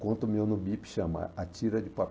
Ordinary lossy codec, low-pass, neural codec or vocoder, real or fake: none; none; none; real